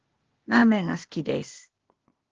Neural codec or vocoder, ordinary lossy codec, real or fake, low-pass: codec, 16 kHz, 0.8 kbps, ZipCodec; Opus, 16 kbps; fake; 7.2 kHz